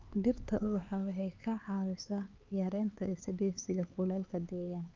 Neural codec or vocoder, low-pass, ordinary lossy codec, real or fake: codec, 16 kHz, 4 kbps, X-Codec, HuBERT features, trained on LibriSpeech; 7.2 kHz; Opus, 24 kbps; fake